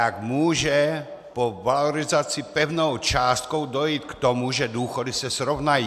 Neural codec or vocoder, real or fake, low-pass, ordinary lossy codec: none; real; 14.4 kHz; AAC, 96 kbps